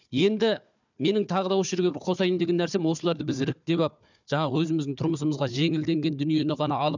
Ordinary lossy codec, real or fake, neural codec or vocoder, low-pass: none; fake; codec, 16 kHz, 4 kbps, FunCodec, trained on Chinese and English, 50 frames a second; 7.2 kHz